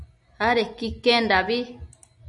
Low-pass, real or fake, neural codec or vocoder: 10.8 kHz; real; none